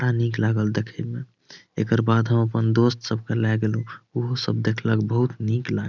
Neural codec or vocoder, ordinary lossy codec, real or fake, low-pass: none; none; real; 7.2 kHz